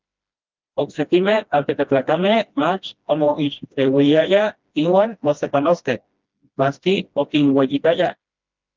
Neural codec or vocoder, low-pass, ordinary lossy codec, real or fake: codec, 16 kHz, 1 kbps, FreqCodec, smaller model; 7.2 kHz; Opus, 24 kbps; fake